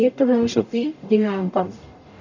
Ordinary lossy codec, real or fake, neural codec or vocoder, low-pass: none; fake; codec, 44.1 kHz, 0.9 kbps, DAC; 7.2 kHz